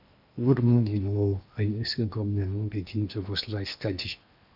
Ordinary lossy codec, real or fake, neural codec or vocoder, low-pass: none; fake; codec, 16 kHz in and 24 kHz out, 0.8 kbps, FocalCodec, streaming, 65536 codes; 5.4 kHz